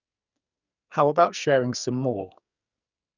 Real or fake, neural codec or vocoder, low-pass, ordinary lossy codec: fake; codec, 44.1 kHz, 2.6 kbps, SNAC; 7.2 kHz; none